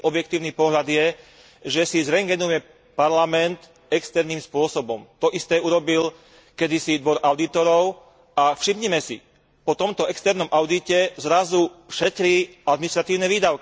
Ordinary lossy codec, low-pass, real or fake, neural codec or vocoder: none; none; real; none